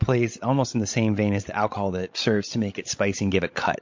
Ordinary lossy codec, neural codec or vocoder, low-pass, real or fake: MP3, 48 kbps; codec, 16 kHz, 16 kbps, FreqCodec, larger model; 7.2 kHz; fake